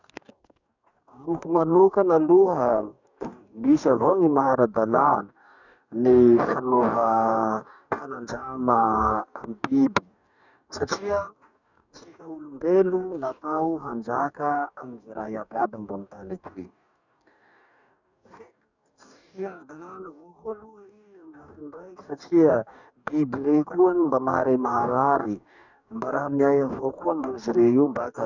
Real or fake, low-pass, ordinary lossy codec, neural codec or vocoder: fake; 7.2 kHz; none; codec, 44.1 kHz, 2.6 kbps, DAC